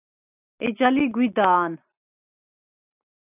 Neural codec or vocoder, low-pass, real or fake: none; 3.6 kHz; real